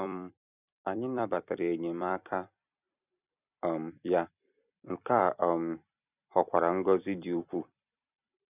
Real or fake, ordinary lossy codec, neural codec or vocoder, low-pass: fake; none; vocoder, 44.1 kHz, 128 mel bands every 256 samples, BigVGAN v2; 3.6 kHz